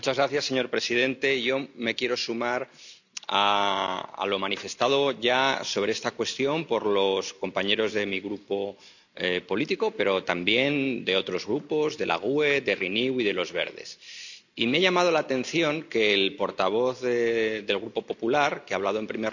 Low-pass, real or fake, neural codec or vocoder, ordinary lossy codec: 7.2 kHz; real; none; none